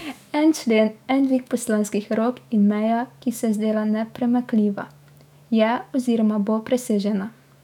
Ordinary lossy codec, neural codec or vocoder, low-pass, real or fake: none; autoencoder, 48 kHz, 128 numbers a frame, DAC-VAE, trained on Japanese speech; 19.8 kHz; fake